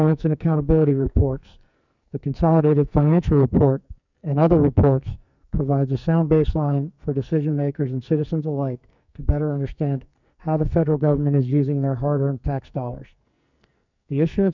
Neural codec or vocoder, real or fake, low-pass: codec, 44.1 kHz, 2.6 kbps, SNAC; fake; 7.2 kHz